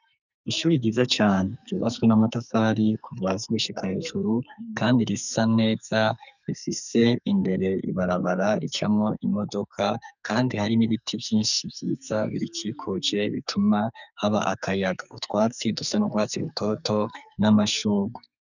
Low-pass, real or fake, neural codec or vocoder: 7.2 kHz; fake; codec, 44.1 kHz, 2.6 kbps, SNAC